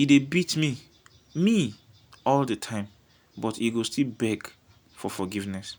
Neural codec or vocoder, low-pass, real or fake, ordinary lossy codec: none; none; real; none